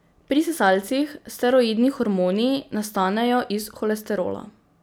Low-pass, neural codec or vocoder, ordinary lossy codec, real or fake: none; none; none; real